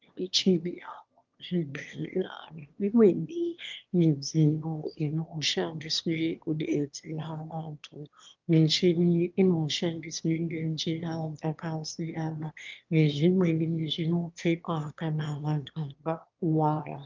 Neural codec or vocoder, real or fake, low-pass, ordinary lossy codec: autoencoder, 22.05 kHz, a latent of 192 numbers a frame, VITS, trained on one speaker; fake; 7.2 kHz; Opus, 32 kbps